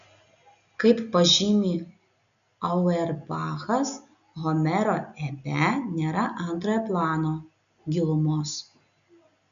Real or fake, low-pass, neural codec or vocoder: real; 7.2 kHz; none